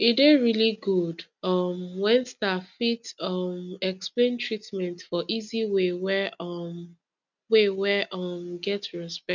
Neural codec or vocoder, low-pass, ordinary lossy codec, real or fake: none; 7.2 kHz; none; real